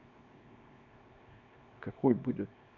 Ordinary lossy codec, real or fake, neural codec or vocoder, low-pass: none; fake; codec, 24 kHz, 0.9 kbps, WavTokenizer, small release; 7.2 kHz